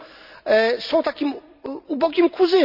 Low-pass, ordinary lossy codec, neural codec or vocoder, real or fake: 5.4 kHz; none; none; real